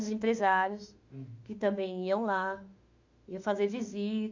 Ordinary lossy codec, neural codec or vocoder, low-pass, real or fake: Opus, 64 kbps; autoencoder, 48 kHz, 32 numbers a frame, DAC-VAE, trained on Japanese speech; 7.2 kHz; fake